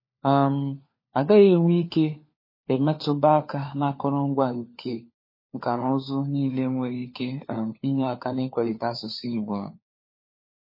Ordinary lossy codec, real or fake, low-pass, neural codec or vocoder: MP3, 24 kbps; fake; 5.4 kHz; codec, 16 kHz, 4 kbps, FunCodec, trained on LibriTTS, 50 frames a second